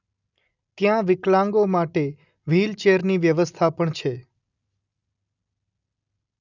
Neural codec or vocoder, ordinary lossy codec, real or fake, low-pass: none; none; real; 7.2 kHz